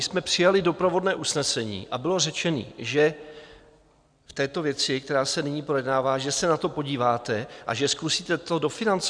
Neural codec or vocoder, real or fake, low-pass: none; real; 9.9 kHz